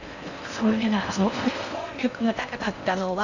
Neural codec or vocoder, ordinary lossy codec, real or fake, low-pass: codec, 16 kHz in and 24 kHz out, 0.6 kbps, FocalCodec, streaming, 4096 codes; none; fake; 7.2 kHz